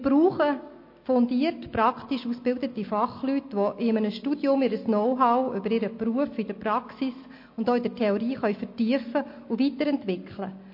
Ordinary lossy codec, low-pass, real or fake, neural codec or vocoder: MP3, 32 kbps; 5.4 kHz; real; none